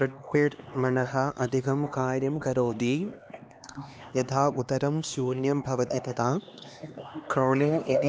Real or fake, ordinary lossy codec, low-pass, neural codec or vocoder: fake; none; none; codec, 16 kHz, 2 kbps, X-Codec, HuBERT features, trained on LibriSpeech